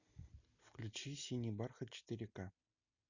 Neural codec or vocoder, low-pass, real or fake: none; 7.2 kHz; real